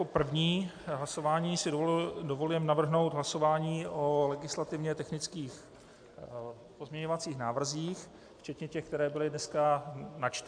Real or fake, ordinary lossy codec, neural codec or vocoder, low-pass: real; AAC, 64 kbps; none; 9.9 kHz